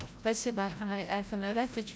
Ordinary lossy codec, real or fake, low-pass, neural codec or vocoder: none; fake; none; codec, 16 kHz, 0.5 kbps, FreqCodec, larger model